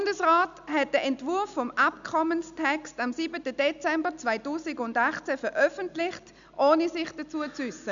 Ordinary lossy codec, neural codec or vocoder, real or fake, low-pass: none; none; real; 7.2 kHz